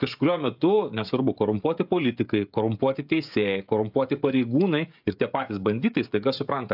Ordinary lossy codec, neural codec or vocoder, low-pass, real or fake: AAC, 48 kbps; codec, 16 kHz, 16 kbps, FreqCodec, smaller model; 5.4 kHz; fake